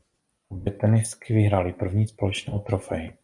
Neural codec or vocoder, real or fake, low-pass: none; real; 10.8 kHz